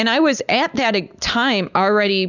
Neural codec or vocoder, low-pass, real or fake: none; 7.2 kHz; real